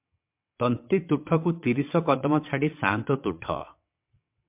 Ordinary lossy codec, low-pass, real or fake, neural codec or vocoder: MP3, 32 kbps; 3.6 kHz; fake; vocoder, 22.05 kHz, 80 mel bands, WaveNeXt